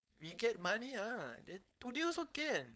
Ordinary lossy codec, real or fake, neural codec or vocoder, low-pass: none; fake; codec, 16 kHz, 4.8 kbps, FACodec; none